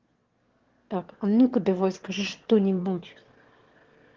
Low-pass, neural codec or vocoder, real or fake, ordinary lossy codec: 7.2 kHz; autoencoder, 22.05 kHz, a latent of 192 numbers a frame, VITS, trained on one speaker; fake; Opus, 16 kbps